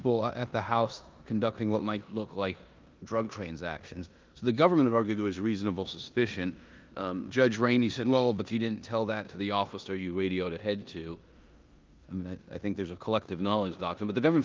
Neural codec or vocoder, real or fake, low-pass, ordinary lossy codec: codec, 16 kHz in and 24 kHz out, 0.9 kbps, LongCat-Audio-Codec, four codebook decoder; fake; 7.2 kHz; Opus, 32 kbps